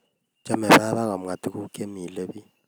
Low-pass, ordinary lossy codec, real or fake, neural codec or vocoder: none; none; real; none